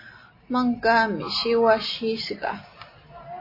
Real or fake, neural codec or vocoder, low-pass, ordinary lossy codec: real; none; 5.4 kHz; MP3, 24 kbps